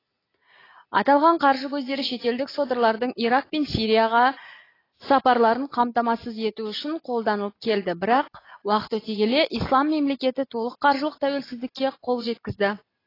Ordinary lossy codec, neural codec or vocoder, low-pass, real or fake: AAC, 24 kbps; none; 5.4 kHz; real